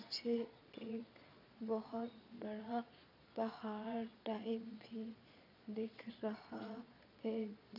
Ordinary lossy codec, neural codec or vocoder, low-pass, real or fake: none; vocoder, 22.05 kHz, 80 mel bands, Vocos; 5.4 kHz; fake